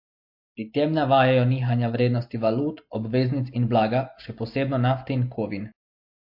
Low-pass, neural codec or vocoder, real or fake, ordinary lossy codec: 5.4 kHz; none; real; MP3, 32 kbps